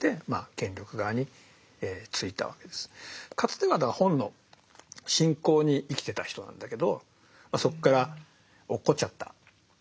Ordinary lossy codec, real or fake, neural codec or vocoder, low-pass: none; real; none; none